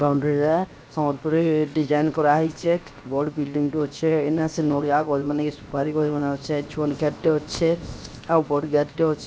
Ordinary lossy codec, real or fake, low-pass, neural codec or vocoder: none; fake; none; codec, 16 kHz, 0.7 kbps, FocalCodec